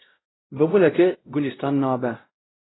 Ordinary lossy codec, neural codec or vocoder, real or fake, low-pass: AAC, 16 kbps; codec, 16 kHz, 0.5 kbps, X-Codec, WavLM features, trained on Multilingual LibriSpeech; fake; 7.2 kHz